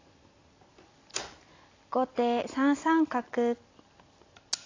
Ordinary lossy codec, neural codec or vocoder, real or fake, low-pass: AAC, 32 kbps; none; real; 7.2 kHz